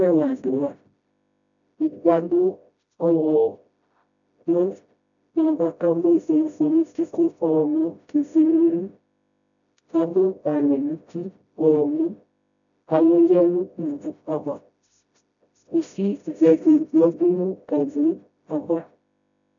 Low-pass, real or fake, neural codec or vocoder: 7.2 kHz; fake; codec, 16 kHz, 0.5 kbps, FreqCodec, smaller model